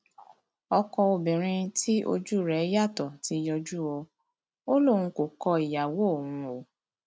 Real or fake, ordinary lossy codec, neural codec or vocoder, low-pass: real; none; none; none